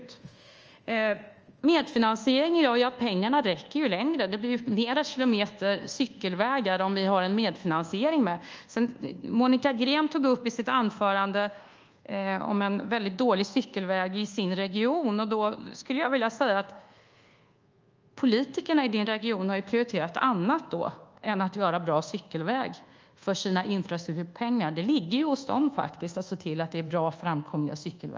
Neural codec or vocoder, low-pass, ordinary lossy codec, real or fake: codec, 24 kHz, 1.2 kbps, DualCodec; 7.2 kHz; Opus, 24 kbps; fake